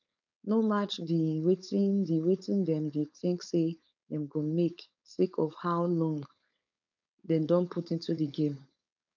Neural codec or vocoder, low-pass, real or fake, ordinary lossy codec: codec, 16 kHz, 4.8 kbps, FACodec; 7.2 kHz; fake; none